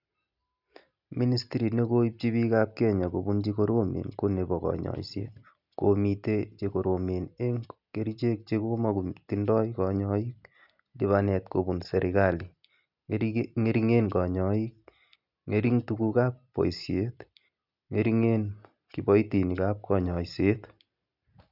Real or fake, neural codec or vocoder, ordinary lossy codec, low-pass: real; none; none; 5.4 kHz